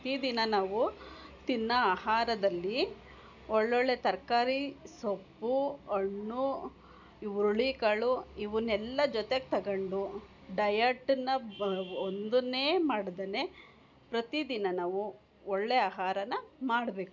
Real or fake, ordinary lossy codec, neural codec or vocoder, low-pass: real; none; none; 7.2 kHz